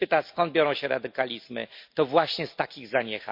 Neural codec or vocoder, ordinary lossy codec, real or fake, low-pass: none; MP3, 32 kbps; real; 5.4 kHz